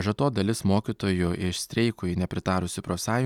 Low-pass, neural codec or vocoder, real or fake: 19.8 kHz; none; real